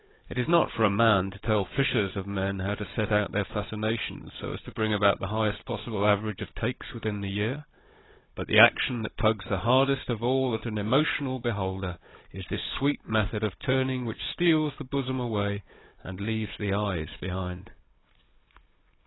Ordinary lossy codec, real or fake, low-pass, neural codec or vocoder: AAC, 16 kbps; fake; 7.2 kHz; codec, 16 kHz, 16 kbps, FunCodec, trained on Chinese and English, 50 frames a second